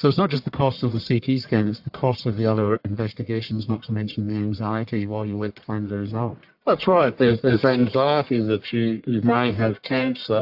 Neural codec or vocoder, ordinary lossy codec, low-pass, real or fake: codec, 44.1 kHz, 1.7 kbps, Pupu-Codec; AAC, 48 kbps; 5.4 kHz; fake